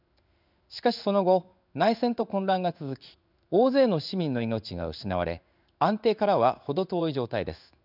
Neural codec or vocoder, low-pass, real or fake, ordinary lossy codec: codec, 16 kHz in and 24 kHz out, 1 kbps, XY-Tokenizer; 5.4 kHz; fake; none